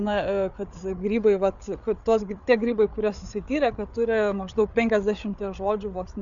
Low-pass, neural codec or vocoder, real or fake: 7.2 kHz; codec, 16 kHz, 8 kbps, FreqCodec, larger model; fake